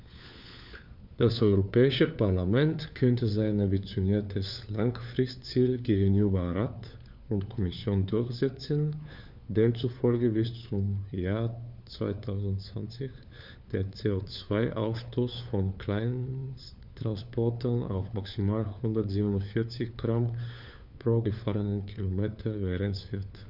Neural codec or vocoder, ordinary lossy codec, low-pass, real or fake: codec, 16 kHz, 4 kbps, FunCodec, trained on LibriTTS, 50 frames a second; none; 5.4 kHz; fake